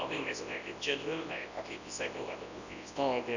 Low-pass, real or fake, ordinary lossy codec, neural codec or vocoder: 7.2 kHz; fake; none; codec, 24 kHz, 0.9 kbps, WavTokenizer, large speech release